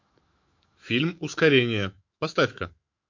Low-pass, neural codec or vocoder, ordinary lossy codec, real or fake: 7.2 kHz; autoencoder, 48 kHz, 128 numbers a frame, DAC-VAE, trained on Japanese speech; MP3, 48 kbps; fake